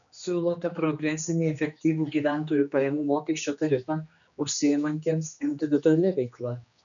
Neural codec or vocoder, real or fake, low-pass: codec, 16 kHz, 2 kbps, X-Codec, HuBERT features, trained on general audio; fake; 7.2 kHz